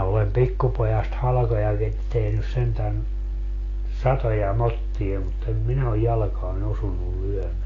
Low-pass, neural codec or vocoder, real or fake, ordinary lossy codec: 7.2 kHz; none; real; AAC, 32 kbps